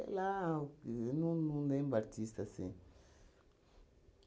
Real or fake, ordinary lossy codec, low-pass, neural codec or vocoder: real; none; none; none